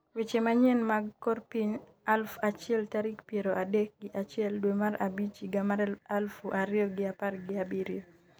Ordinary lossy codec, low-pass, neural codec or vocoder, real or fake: none; none; none; real